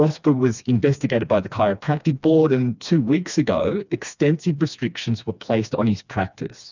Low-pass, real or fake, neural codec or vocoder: 7.2 kHz; fake; codec, 16 kHz, 2 kbps, FreqCodec, smaller model